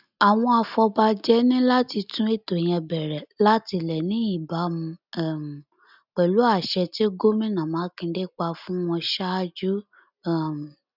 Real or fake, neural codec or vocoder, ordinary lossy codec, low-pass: real; none; none; 5.4 kHz